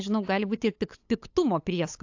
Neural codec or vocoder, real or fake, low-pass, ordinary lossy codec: codec, 16 kHz, 8 kbps, FunCodec, trained on LibriTTS, 25 frames a second; fake; 7.2 kHz; AAC, 48 kbps